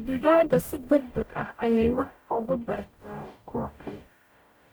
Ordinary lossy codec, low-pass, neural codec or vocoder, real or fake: none; none; codec, 44.1 kHz, 0.9 kbps, DAC; fake